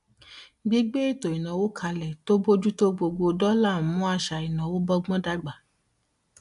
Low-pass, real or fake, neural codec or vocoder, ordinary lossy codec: 10.8 kHz; real; none; AAC, 96 kbps